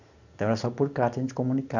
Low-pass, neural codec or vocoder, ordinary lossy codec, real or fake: 7.2 kHz; none; none; real